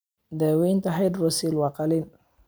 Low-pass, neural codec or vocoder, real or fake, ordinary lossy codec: none; none; real; none